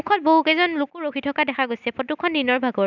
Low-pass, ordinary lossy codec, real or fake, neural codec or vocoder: 7.2 kHz; none; real; none